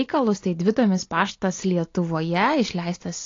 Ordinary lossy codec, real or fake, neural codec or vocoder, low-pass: AAC, 32 kbps; real; none; 7.2 kHz